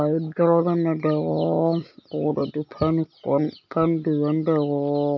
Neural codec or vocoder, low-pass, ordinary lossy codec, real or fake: none; 7.2 kHz; none; real